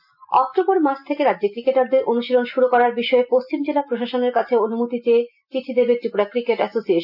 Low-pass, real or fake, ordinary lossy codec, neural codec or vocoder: 5.4 kHz; real; none; none